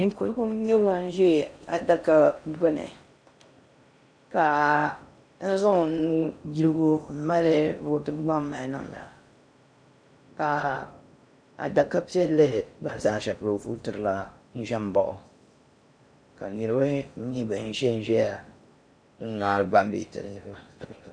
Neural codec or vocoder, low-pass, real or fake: codec, 16 kHz in and 24 kHz out, 0.6 kbps, FocalCodec, streaming, 4096 codes; 9.9 kHz; fake